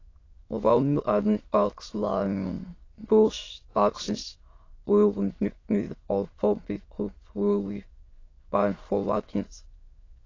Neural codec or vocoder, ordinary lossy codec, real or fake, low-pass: autoencoder, 22.05 kHz, a latent of 192 numbers a frame, VITS, trained on many speakers; AAC, 32 kbps; fake; 7.2 kHz